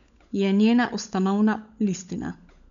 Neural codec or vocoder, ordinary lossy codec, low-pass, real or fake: codec, 16 kHz, 16 kbps, FunCodec, trained on LibriTTS, 50 frames a second; none; 7.2 kHz; fake